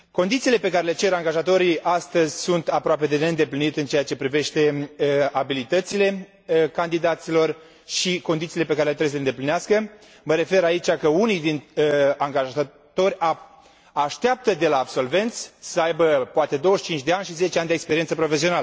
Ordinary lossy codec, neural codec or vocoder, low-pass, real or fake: none; none; none; real